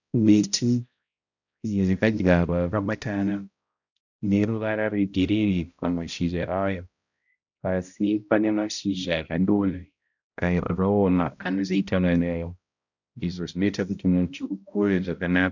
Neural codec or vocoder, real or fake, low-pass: codec, 16 kHz, 0.5 kbps, X-Codec, HuBERT features, trained on balanced general audio; fake; 7.2 kHz